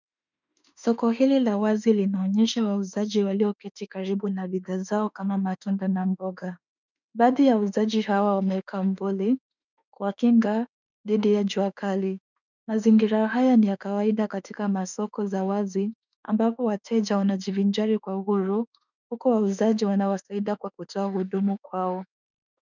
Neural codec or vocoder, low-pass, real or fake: autoencoder, 48 kHz, 32 numbers a frame, DAC-VAE, trained on Japanese speech; 7.2 kHz; fake